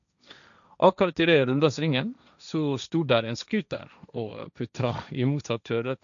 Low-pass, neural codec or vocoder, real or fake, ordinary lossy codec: 7.2 kHz; codec, 16 kHz, 1.1 kbps, Voila-Tokenizer; fake; none